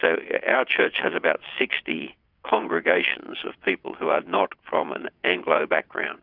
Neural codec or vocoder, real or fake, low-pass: vocoder, 22.05 kHz, 80 mel bands, WaveNeXt; fake; 5.4 kHz